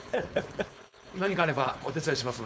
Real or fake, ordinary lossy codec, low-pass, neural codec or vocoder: fake; none; none; codec, 16 kHz, 4.8 kbps, FACodec